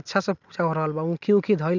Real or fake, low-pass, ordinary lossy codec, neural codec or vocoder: real; 7.2 kHz; none; none